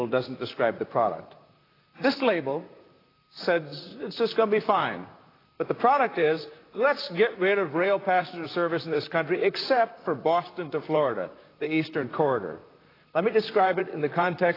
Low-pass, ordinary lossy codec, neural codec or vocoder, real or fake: 5.4 kHz; AAC, 24 kbps; vocoder, 44.1 kHz, 128 mel bands, Pupu-Vocoder; fake